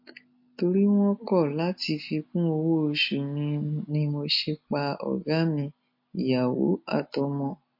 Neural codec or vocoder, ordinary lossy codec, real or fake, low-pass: none; MP3, 32 kbps; real; 5.4 kHz